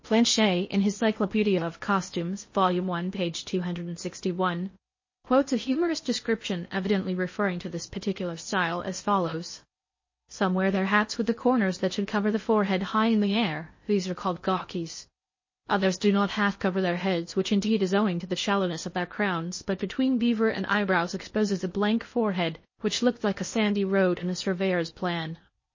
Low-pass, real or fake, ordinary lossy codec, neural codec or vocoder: 7.2 kHz; fake; MP3, 32 kbps; codec, 16 kHz in and 24 kHz out, 0.6 kbps, FocalCodec, streaming, 4096 codes